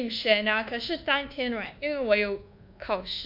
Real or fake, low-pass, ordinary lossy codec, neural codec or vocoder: fake; 5.4 kHz; MP3, 48 kbps; codec, 24 kHz, 1.2 kbps, DualCodec